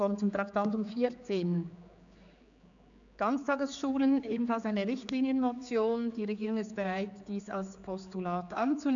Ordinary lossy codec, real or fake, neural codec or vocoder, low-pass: none; fake; codec, 16 kHz, 4 kbps, X-Codec, HuBERT features, trained on general audio; 7.2 kHz